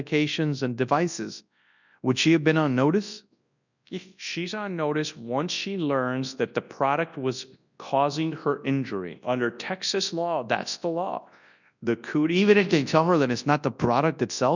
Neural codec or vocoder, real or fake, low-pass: codec, 24 kHz, 0.9 kbps, WavTokenizer, large speech release; fake; 7.2 kHz